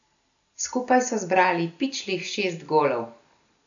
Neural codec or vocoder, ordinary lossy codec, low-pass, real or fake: none; none; 7.2 kHz; real